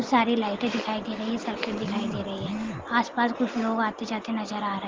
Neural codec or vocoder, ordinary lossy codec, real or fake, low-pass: none; Opus, 16 kbps; real; 7.2 kHz